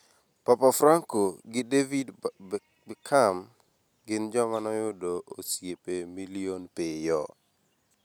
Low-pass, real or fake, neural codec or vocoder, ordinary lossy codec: none; real; none; none